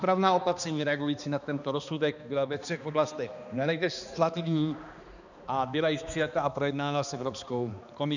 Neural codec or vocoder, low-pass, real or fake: codec, 16 kHz, 2 kbps, X-Codec, HuBERT features, trained on balanced general audio; 7.2 kHz; fake